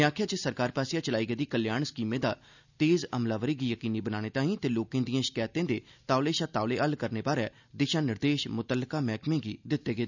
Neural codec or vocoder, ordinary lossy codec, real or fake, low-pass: none; none; real; 7.2 kHz